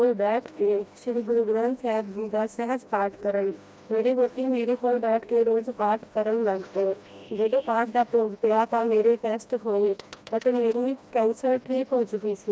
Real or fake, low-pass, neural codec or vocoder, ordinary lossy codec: fake; none; codec, 16 kHz, 1 kbps, FreqCodec, smaller model; none